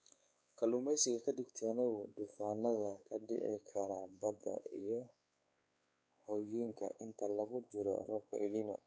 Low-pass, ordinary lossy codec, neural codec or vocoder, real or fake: none; none; codec, 16 kHz, 4 kbps, X-Codec, WavLM features, trained on Multilingual LibriSpeech; fake